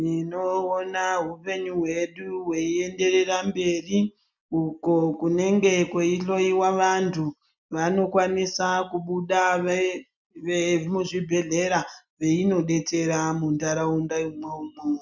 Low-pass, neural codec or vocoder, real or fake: 7.2 kHz; none; real